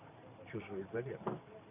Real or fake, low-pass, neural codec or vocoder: fake; 3.6 kHz; vocoder, 44.1 kHz, 128 mel bands every 512 samples, BigVGAN v2